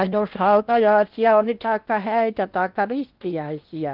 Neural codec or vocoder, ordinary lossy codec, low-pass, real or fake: codec, 16 kHz in and 24 kHz out, 0.8 kbps, FocalCodec, streaming, 65536 codes; Opus, 32 kbps; 5.4 kHz; fake